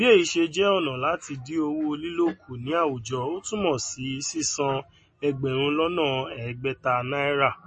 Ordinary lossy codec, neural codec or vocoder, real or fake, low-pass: MP3, 32 kbps; none; real; 10.8 kHz